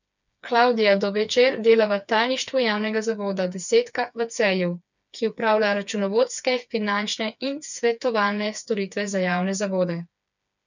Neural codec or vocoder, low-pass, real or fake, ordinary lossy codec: codec, 16 kHz, 4 kbps, FreqCodec, smaller model; 7.2 kHz; fake; none